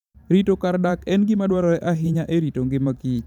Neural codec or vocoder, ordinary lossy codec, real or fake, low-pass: vocoder, 44.1 kHz, 128 mel bands every 512 samples, BigVGAN v2; none; fake; 19.8 kHz